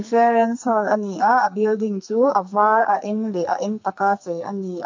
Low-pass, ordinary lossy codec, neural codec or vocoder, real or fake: 7.2 kHz; MP3, 48 kbps; codec, 44.1 kHz, 2.6 kbps, SNAC; fake